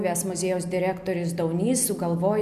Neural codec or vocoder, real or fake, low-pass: none; real; 14.4 kHz